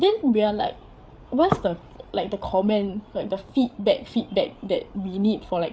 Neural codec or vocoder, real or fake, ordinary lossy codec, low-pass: codec, 16 kHz, 8 kbps, FreqCodec, larger model; fake; none; none